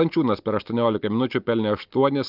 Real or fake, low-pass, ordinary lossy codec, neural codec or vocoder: real; 5.4 kHz; Opus, 32 kbps; none